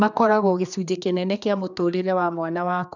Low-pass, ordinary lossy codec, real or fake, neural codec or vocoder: 7.2 kHz; none; fake; codec, 16 kHz, 2 kbps, X-Codec, HuBERT features, trained on general audio